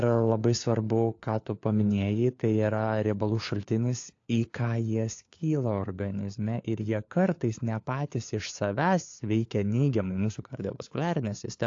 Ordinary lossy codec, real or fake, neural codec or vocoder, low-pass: AAC, 48 kbps; fake; codec, 16 kHz, 4 kbps, FunCodec, trained on LibriTTS, 50 frames a second; 7.2 kHz